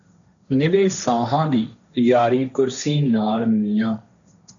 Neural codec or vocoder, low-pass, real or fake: codec, 16 kHz, 1.1 kbps, Voila-Tokenizer; 7.2 kHz; fake